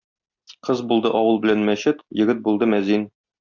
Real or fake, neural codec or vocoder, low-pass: real; none; 7.2 kHz